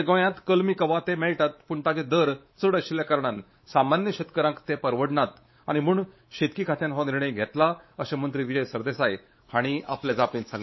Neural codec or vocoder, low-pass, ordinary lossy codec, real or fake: codec, 24 kHz, 3.1 kbps, DualCodec; 7.2 kHz; MP3, 24 kbps; fake